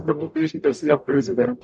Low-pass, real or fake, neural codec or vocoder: 10.8 kHz; fake; codec, 44.1 kHz, 0.9 kbps, DAC